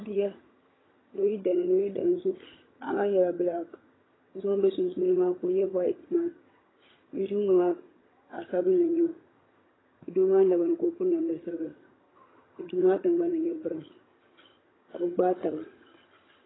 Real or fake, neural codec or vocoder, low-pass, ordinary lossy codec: fake; vocoder, 22.05 kHz, 80 mel bands, HiFi-GAN; 7.2 kHz; AAC, 16 kbps